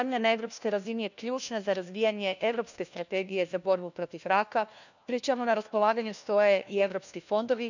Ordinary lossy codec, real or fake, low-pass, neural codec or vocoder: none; fake; 7.2 kHz; codec, 16 kHz, 1 kbps, FunCodec, trained on LibriTTS, 50 frames a second